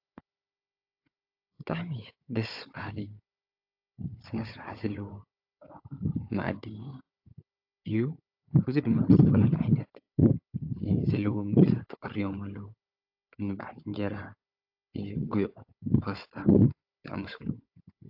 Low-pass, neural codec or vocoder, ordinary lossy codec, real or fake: 5.4 kHz; codec, 16 kHz, 4 kbps, FunCodec, trained on Chinese and English, 50 frames a second; AAC, 48 kbps; fake